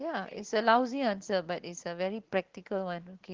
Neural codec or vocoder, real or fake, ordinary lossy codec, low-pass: none; real; Opus, 16 kbps; 7.2 kHz